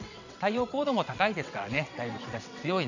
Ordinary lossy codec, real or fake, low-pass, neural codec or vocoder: none; fake; 7.2 kHz; vocoder, 22.05 kHz, 80 mel bands, WaveNeXt